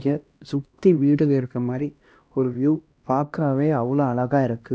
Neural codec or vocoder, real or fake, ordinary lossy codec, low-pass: codec, 16 kHz, 1 kbps, X-Codec, HuBERT features, trained on LibriSpeech; fake; none; none